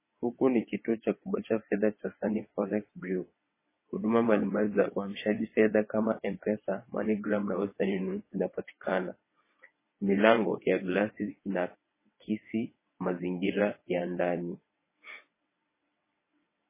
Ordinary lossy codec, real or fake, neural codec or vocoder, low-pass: MP3, 16 kbps; fake; vocoder, 22.05 kHz, 80 mel bands, WaveNeXt; 3.6 kHz